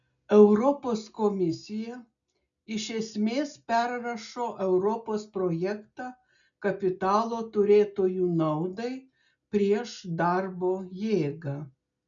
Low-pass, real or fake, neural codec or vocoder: 7.2 kHz; real; none